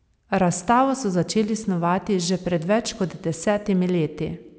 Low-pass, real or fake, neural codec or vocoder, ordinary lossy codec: none; real; none; none